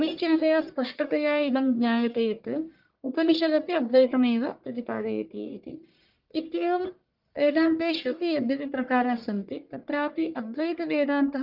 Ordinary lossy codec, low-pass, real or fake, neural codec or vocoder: Opus, 32 kbps; 5.4 kHz; fake; codec, 44.1 kHz, 1.7 kbps, Pupu-Codec